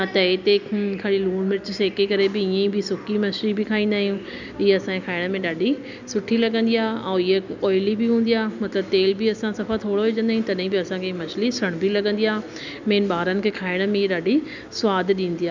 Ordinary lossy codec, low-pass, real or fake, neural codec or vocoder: none; 7.2 kHz; real; none